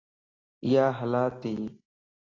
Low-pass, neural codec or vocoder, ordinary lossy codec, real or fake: 7.2 kHz; none; AAC, 32 kbps; real